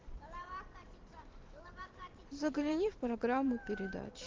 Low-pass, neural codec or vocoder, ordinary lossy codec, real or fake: 7.2 kHz; vocoder, 44.1 kHz, 128 mel bands every 512 samples, BigVGAN v2; Opus, 16 kbps; fake